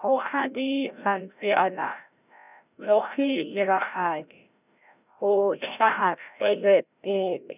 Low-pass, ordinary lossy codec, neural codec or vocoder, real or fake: 3.6 kHz; none; codec, 16 kHz, 0.5 kbps, FreqCodec, larger model; fake